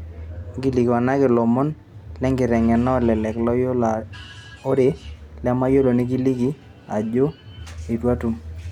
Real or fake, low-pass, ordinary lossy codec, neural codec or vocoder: real; 19.8 kHz; none; none